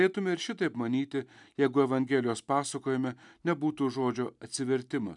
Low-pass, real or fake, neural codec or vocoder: 10.8 kHz; real; none